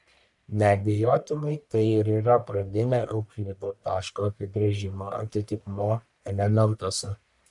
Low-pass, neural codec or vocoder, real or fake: 10.8 kHz; codec, 44.1 kHz, 1.7 kbps, Pupu-Codec; fake